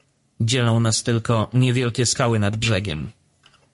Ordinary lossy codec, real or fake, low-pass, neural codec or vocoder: MP3, 48 kbps; fake; 14.4 kHz; codec, 44.1 kHz, 3.4 kbps, Pupu-Codec